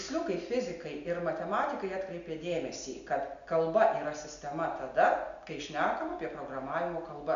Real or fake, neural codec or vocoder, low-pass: real; none; 7.2 kHz